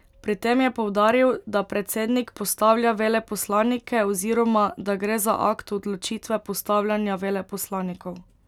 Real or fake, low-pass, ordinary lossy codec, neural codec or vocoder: real; 19.8 kHz; none; none